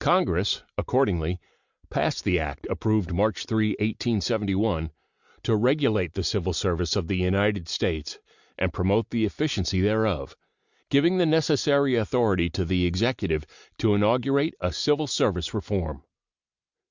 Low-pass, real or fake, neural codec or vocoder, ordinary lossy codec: 7.2 kHz; real; none; Opus, 64 kbps